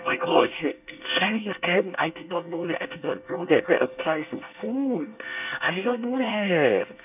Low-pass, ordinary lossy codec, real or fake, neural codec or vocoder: 3.6 kHz; none; fake; codec, 24 kHz, 1 kbps, SNAC